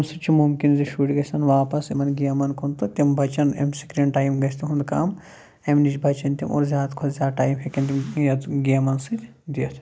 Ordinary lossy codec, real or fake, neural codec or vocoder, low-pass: none; real; none; none